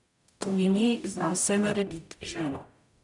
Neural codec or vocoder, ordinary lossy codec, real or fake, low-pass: codec, 44.1 kHz, 0.9 kbps, DAC; none; fake; 10.8 kHz